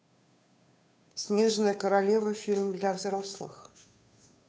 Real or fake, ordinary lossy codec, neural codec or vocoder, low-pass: fake; none; codec, 16 kHz, 2 kbps, FunCodec, trained on Chinese and English, 25 frames a second; none